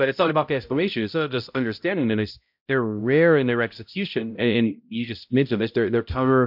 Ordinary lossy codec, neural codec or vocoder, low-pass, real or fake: MP3, 48 kbps; codec, 16 kHz, 0.5 kbps, X-Codec, HuBERT features, trained on balanced general audio; 5.4 kHz; fake